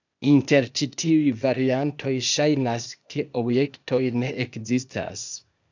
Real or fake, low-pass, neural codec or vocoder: fake; 7.2 kHz; codec, 16 kHz, 0.8 kbps, ZipCodec